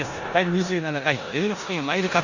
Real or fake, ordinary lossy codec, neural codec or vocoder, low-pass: fake; Opus, 64 kbps; codec, 16 kHz in and 24 kHz out, 0.9 kbps, LongCat-Audio-Codec, four codebook decoder; 7.2 kHz